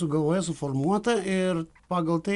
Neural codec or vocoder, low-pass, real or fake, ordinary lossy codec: none; 10.8 kHz; real; Opus, 64 kbps